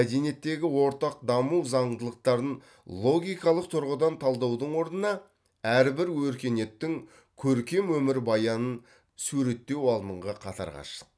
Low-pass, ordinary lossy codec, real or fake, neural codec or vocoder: none; none; real; none